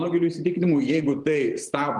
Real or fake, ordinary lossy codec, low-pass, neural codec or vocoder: fake; Opus, 24 kbps; 10.8 kHz; vocoder, 44.1 kHz, 128 mel bands every 512 samples, BigVGAN v2